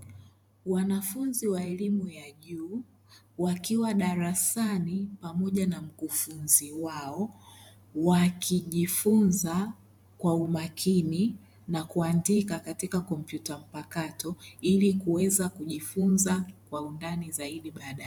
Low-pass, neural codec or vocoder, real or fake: 19.8 kHz; vocoder, 44.1 kHz, 128 mel bands every 512 samples, BigVGAN v2; fake